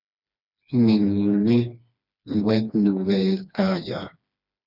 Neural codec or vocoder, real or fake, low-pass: codec, 16 kHz, 2 kbps, FreqCodec, smaller model; fake; 5.4 kHz